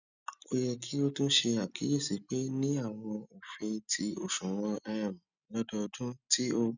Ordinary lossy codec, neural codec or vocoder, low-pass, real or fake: none; none; 7.2 kHz; real